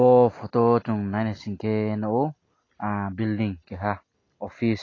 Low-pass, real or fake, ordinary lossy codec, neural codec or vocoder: 7.2 kHz; real; AAC, 32 kbps; none